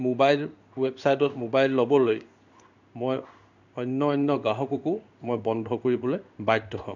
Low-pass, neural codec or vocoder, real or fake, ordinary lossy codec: 7.2 kHz; codec, 16 kHz in and 24 kHz out, 1 kbps, XY-Tokenizer; fake; none